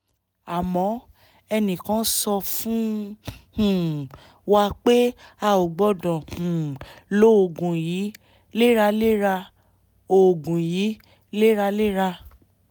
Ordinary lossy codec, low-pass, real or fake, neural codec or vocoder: none; none; real; none